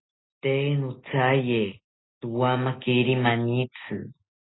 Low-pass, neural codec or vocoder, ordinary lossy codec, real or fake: 7.2 kHz; none; AAC, 16 kbps; real